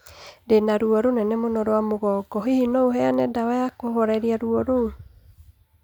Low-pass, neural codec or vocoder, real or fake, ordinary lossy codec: 19.8 kHz; none; real; none